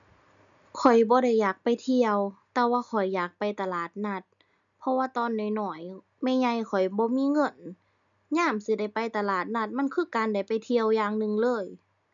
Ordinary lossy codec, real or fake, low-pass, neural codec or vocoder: none; real; 7.2 kHz; none